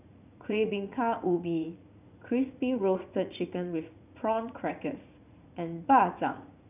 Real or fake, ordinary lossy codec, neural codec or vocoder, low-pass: fake; none; vocoder, 44.1 kHz, 80 mel bands, Vocos; 3.6 kHz